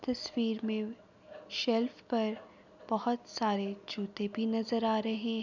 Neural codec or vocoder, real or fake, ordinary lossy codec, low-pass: none; real; none; 7.2 kHz